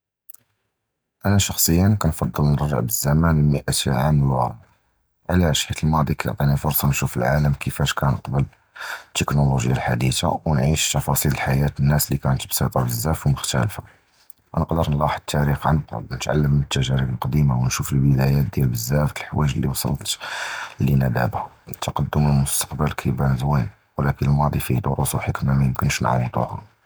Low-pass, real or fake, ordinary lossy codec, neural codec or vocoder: none; real; none; none